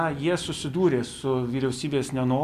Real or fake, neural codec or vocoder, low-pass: real; none; 14.4 kHz